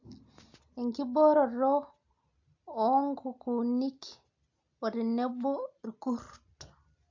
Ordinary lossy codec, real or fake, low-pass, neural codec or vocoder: AAC, 48 kbps; real; 7.2 kHz; none